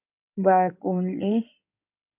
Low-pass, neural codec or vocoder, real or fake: 3.6 kHz; codec, 16 kHz in and 24 kHz out, 2.2 kbps, FireRedTTS-2 codec; fake